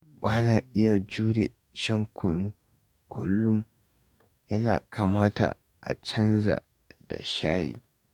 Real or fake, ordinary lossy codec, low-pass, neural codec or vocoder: fake; none; 19.8 kHz; codec, 44.1 kHz, 2.6 kbps, DAC